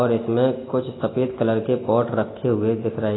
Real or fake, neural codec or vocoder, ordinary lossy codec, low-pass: real; none; AAC, 16 kbps; 7.2 kHz